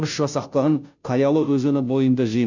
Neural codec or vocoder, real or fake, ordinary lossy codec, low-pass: codec, 16 kHz, 0.5 kbps, FunCodec, trained on Chinese and English, 25 frames a second; fake; none; 7.2 kHz